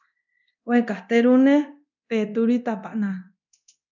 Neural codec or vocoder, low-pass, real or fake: codec, 24 kHz, 0.9 kbps, DualCodec; 7.2 kHz; fake